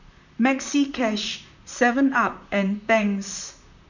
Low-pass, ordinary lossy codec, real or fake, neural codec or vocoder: 7.2 kHz; none; fake; vocoder, 44.1 kHz, 128 mel bands, Pupu-Vocoder